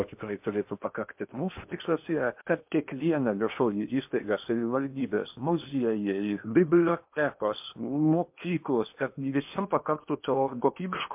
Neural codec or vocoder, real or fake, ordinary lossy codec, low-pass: codec, 16 kHz in and 24 kHz out, 0.8 kbps, FocalCodec, streaming, 65536 codes; fake; MP3, 32 kbps; 3.6 kHz